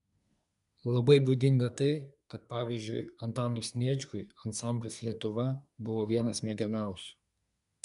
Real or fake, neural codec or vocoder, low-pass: fake; codec, 24 kHz, 1 kbps, SNAC; 10.8 kHz